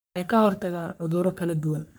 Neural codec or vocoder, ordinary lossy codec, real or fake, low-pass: codec, 44.1 kHz, 3.4 kbps, Pupu-Codec; none; fake; none